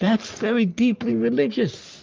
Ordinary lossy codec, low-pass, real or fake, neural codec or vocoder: Opus, 24 kbps; 7.2 kHz; fake; codec, 44.1 kHz, 3.4 kbps, Pupu-Codec